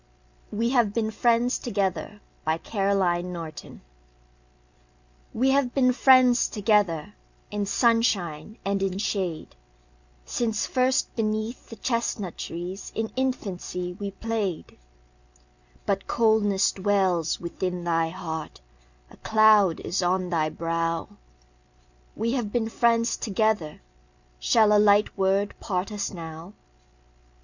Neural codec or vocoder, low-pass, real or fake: none; 7.2 kHz; real